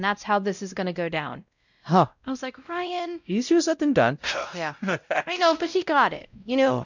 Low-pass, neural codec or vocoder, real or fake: 7.2 kHz; codec, 16 kHz, 0.5 kbps, X-Codec, WavLM features, trained on Multilingual LibriSpeech; fake